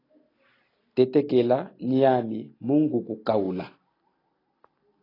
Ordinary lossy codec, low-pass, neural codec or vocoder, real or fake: AAC, 24 kbps; 5.4 kHz; none; real